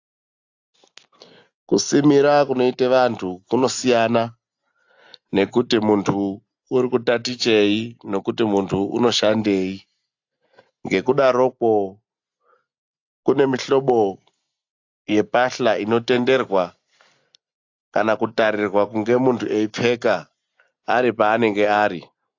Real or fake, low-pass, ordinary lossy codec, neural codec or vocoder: real; 7.2 kHz; AAC, 48 kbps; none